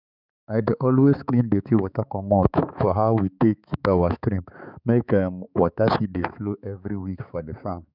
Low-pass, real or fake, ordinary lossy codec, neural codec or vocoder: 5.4 kHz; fake; none; codec, 16 kHz, 4 kbps, X-Codec, HuBERT features, trained on balanced general audio